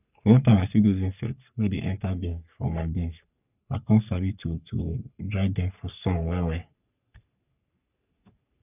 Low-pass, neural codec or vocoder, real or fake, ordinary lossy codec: 3.6 kHz; codec, 44.1 kHz, 3.4 kbps, Pupu-Codec; fake; none